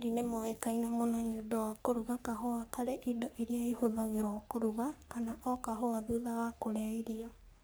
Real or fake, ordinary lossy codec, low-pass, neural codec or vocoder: fake; none; none; codec, 44.1 kHz, 3.4 kbps, Pupu-Codec